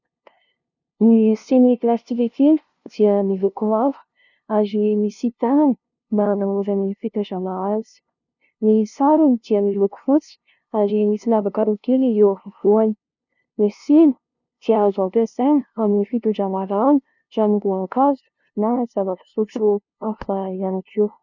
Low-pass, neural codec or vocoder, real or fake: 7.2 kHz; codec, 16 kHz, 0.5 kbps, FunCodec, trained on LibriTTS, 25 frames a second; fake